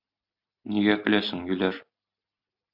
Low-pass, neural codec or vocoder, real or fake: 5.4 kHz; none; real